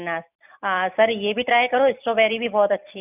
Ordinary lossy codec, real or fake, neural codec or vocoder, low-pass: none; real; none; 3.6 kHz